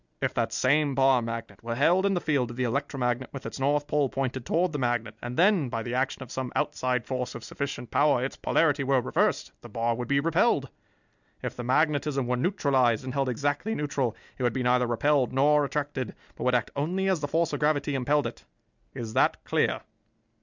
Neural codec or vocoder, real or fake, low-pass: none; real; 7.2 kHz